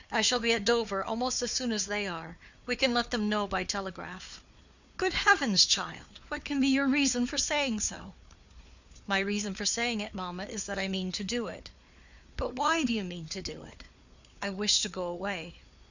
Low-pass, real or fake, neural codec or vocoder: 7.2 kHz; fake; codec, 16 kHz, 4 kbps, FunCodec, trained on Chinese and English, 50 frames a second